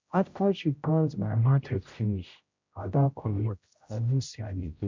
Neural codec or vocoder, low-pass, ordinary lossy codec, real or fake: codec, 16 kHz, 0.5 kbps, X-Codec, HuBERT features, trained on general audio; 7.2 kHz; MP3, 48 kbps; fake